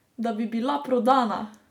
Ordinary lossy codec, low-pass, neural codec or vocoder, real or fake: none; 19.8 kHz; none; real